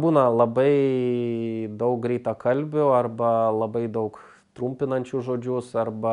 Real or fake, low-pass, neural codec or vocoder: real; 10.8 kHz; none